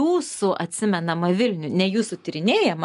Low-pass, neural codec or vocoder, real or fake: 10.8 kHz; none; real